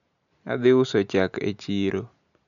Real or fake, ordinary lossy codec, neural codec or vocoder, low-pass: real; none; none; 7.2 kHz